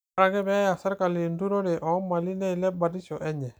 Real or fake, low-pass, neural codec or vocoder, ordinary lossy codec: real; none; none; none